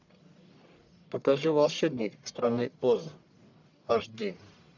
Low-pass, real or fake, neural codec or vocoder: 7.2 kHz; fake; codec, 44.1 kHz, 1.7 kbps, Pupu-Codec